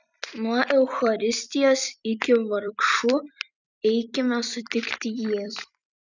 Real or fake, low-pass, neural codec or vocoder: fake; 7.2 kHz; vocoder, 44.1 kHz, 128 mel bands every 256 samples, BigVGAN v2